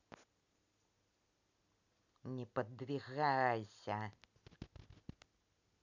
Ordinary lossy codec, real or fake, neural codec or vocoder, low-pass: none; real; none; 7.2 kHz